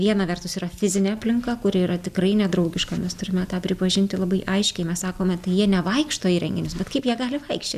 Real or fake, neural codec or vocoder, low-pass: real; none; 14.4 kHz